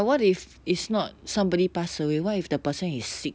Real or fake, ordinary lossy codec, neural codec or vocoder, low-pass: real; none; none; none